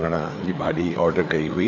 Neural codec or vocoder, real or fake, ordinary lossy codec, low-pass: codec, 16 kHz, 4 kbps, FreqCodec, larger model; fake; none; 7.2 kHz